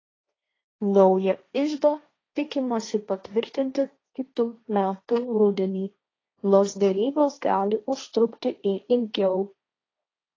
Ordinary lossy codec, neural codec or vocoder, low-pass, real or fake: AAC, 32 kbps; codec, 16 kHz, 1.1 kbps, Voila-Tokenizer; 7.2 kHz; fake